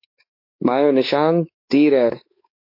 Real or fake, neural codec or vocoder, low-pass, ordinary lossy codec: fake; codec, 16 kHz, 4 kbps, X-Codec, WavLM features, trained on Multilingual LibriSpeech; 5.4 kHz; MP3, 32 kbps